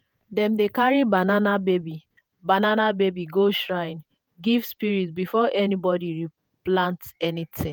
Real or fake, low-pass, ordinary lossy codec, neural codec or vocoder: fake; none; none; vocoder, 48 kHz, 128 mel bands, Vocos